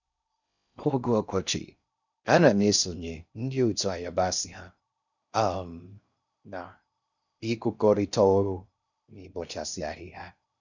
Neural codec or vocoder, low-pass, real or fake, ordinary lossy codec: codec, 16 kHz in and 24 kHz out, 0.6 kbps, FocalCodec, streaming, 4096 codes; 7.2 kHz; fake; none